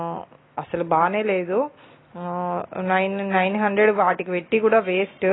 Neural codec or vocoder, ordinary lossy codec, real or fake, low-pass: none; AAC, 16 kbps; real; 7.2 kHz